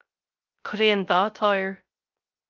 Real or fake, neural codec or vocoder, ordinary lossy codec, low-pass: fake; codec, 16 kHz, 0.3 kbps, FocalCodec; Opus, 24 kbps; 7.2 kHz